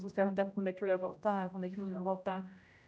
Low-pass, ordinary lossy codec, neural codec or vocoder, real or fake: none; none; codec, 16 kHz, 0.5 kbps, X-Codec, HuBERT features, trained on general audio; fake